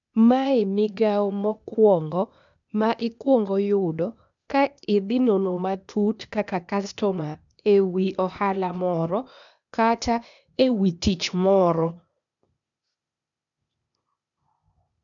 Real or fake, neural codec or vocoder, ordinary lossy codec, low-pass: fake; codec, 16 kHz, 0.8 kbps, ZipCodec; none; 7.2 kHz